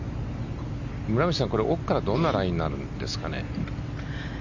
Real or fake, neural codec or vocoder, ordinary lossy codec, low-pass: real; none; none; 7.2 kHz